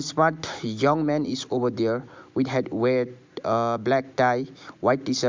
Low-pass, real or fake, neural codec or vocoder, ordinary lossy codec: 7.2 kHz; real; none; MP3, 64 kbps